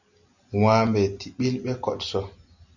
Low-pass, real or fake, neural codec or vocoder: 7.2 kHz; real; none